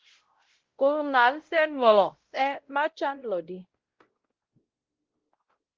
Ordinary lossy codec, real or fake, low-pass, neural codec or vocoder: Opus, 16 kbps; fake; 7.2 kHz; codec, 16 kHz, 0.5 kbps, X-Codec, WavLM features, trained on Multilingual LibriSpeech